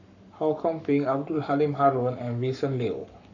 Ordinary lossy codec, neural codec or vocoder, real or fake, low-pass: none; codec, 44.1 kHz, 7.8 kbps, Pupu-Codec; fake; 7.2 kHz